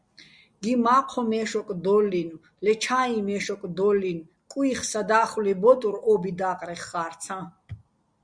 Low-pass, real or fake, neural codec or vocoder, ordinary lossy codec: 9.9 kHz; real; none; Opus, 64 kbps